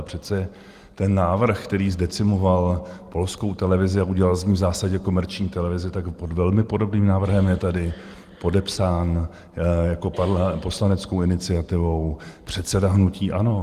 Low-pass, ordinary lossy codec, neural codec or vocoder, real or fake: 14.4 kHz; Opus, 24 kbps; none; real